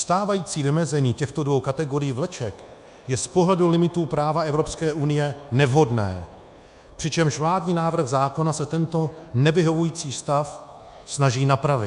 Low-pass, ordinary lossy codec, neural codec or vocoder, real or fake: 10.8 kHz; MP3, 96 kbps; codec, 24 kHz, 1.2 kbps, DualCodec; fake